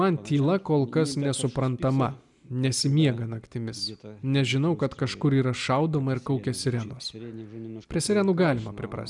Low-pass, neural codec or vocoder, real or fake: 10.8 kHz; none; real